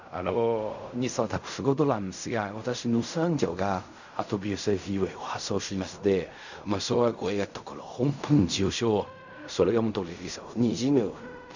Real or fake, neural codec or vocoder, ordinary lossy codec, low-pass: fake; codec, 16 kHz in and 24 kHz out, 0.4 kbps, LongCat-Audio-Codec, fine tuned four codebook decoder; none; 7.2 kHz